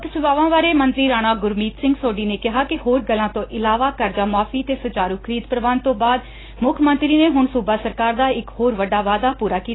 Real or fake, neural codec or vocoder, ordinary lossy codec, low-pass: real; none; AAC, 16 kbps; 7.2 kHz